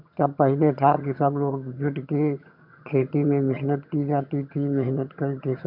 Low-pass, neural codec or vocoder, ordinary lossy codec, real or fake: 5.4 kHz; vocoder, 22.05 kHz, 80 mel bands, HiFi-GAN; none; fake